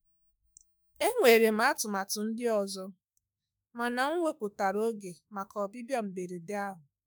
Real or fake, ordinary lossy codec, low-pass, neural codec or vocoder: fake; none; none; autoencoder, 48 kHz, 32 numbers a frame, DAC-VAE, trained on Japanese speech